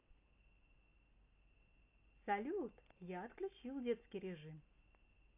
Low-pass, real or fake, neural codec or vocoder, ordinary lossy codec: 3.6 kHz; real; none; AAC, 24 kbps